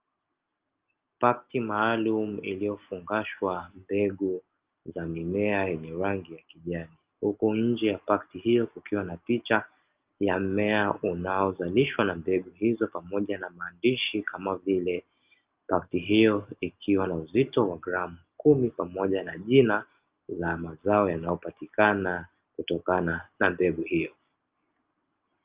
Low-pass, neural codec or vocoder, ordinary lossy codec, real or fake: 3.6 kHz; none; Opus, 24 kbps; real